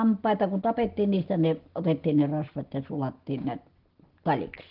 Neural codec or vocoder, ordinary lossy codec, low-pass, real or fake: none; Opus, 16 kbps; 5.4 kHz; real